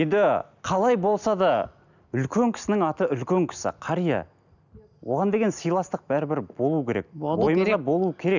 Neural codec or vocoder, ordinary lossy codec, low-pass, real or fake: none; none; 7.2 kHz; real